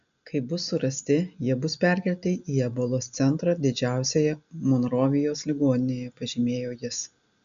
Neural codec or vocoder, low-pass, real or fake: none; 7.2 kHz; real